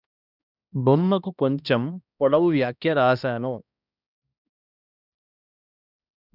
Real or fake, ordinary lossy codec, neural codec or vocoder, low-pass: fake; none; codec, 16 kHz, 1 kbps, X-Codec, HuBERT features, trained on balanced general audio; 5.4 kHz